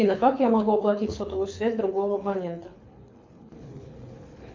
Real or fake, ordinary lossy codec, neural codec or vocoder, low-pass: fake; MP3, 64 kbps; codec, 24 kHz, 6 kbps, HILCodec; 7.2 kHz